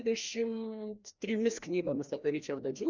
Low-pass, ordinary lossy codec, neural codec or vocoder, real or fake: 7.2 kHz; Opus, 64 kbps; codec, 16 kHz, 1 kbps, FreqCodec, larger model; fake